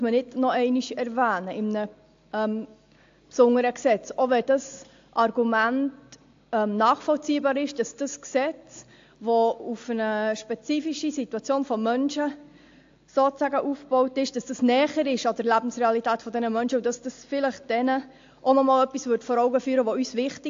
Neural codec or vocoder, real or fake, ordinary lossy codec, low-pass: none; real; AAC, 48 kbps; 7.2 kHz